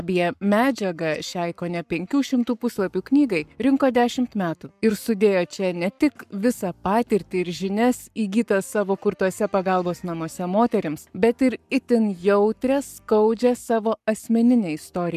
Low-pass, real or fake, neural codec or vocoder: 14.4 kHz; fake; codec, 44.1 kHz, 7.8 kbps, Pupu-Codec